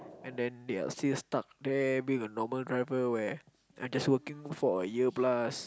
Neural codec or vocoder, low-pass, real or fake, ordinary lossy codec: none; none; real; none